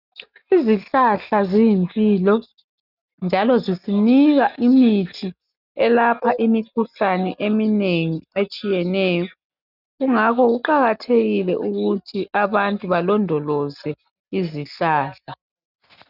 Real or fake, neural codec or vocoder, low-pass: real; none; 5.4 kHz